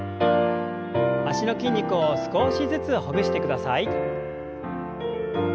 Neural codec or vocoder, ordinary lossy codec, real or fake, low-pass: none; none; real; none